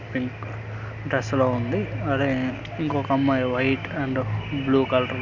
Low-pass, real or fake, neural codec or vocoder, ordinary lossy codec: 7.2 kHz; real; none; none